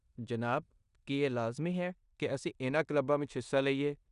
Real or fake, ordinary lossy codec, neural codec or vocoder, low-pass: fake; none; codec, 16 kHz in and 24 kHz out, 0.9 kbps, LongCat-Audio-Codec, four codebook decoder; 10.8 kHz